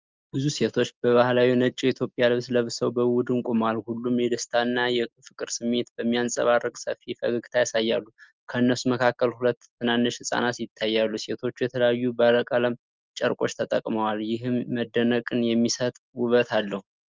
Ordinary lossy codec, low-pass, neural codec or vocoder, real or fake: Opus, 32 kbps; 7.2 kHz; none; real